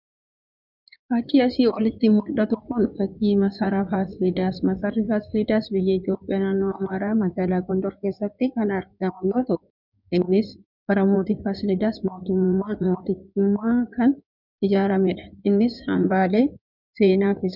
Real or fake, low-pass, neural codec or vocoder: fake; 5.4 kHz; codec, 16 kHz in and 24 kHz out, 2.2 kbps, FireRedTTS-2 codec